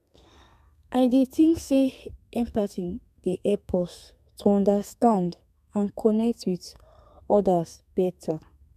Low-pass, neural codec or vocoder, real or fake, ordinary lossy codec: 14.4 kHz; codec, 32 kHz, 1.9 kbps, SNAC; fake; none